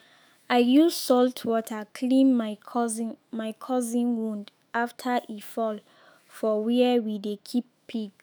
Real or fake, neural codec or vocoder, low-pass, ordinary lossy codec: fake; autoencoder, 48 kHz, 128 numbers a frame, DAC-VAE, trained on Japanese speech; none; none